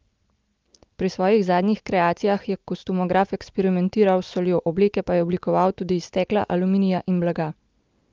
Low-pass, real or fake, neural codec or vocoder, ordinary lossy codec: 7.2 kHz; real; none; Opus, 24 kbps